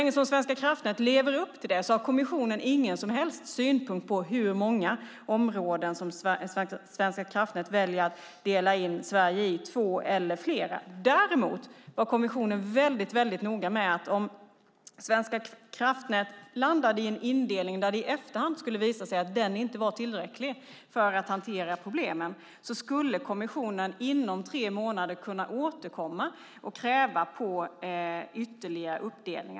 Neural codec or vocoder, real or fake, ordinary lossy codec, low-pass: none; real; none; none